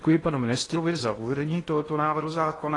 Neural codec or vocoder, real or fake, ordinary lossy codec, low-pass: codec, 16 kHz in and 24 kHz out, 0.8 kbps, FocalCodec, streaming, 65536 codes; fake; AAC, 32 kbps; 10.8 kHz